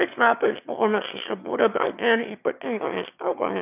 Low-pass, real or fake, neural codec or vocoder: 3.6 kHz; fake; autoencoder, 22.05 kHz, a latent of 192 numbers a frame, VITS, trained on one speaker